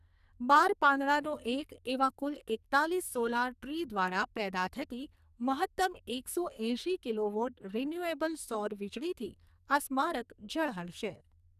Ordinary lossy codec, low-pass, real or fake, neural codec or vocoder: none; 14.4 kHz; fake; codec, 44.1 kHz, 2.6 kbps, SNAC